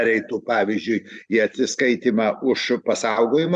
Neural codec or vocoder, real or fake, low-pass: none; real; 9.9 kHz